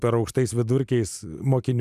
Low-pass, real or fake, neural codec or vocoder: 14.4 kHz; real; none